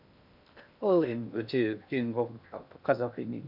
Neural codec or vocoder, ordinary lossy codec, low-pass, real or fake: codec, 16 kHz in and 24 kHz out, 0.6 kbps, FocalCodec, streaming, 2048 codes; none; 5.4 kHz; fake